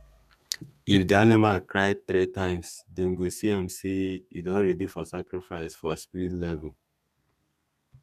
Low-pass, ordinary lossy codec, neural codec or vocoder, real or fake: 14.4 kHz; none; codec, 32 kHz, 1.9 kbps, SNAC; fake